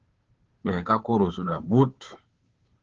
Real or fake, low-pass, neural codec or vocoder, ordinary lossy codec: fake; 7.2 kHz; codec, 16 kHz, 2 kbps, FunCodec, trained on Chinese and English, 25 frames a second; Opus, 16 kbps